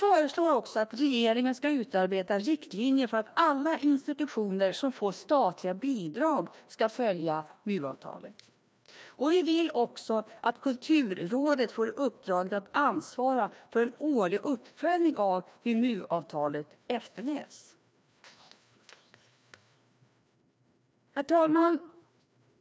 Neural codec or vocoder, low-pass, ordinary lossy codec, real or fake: codec, 16 kHz, 1 kbps, FreqCodec, larger model; none; none; fake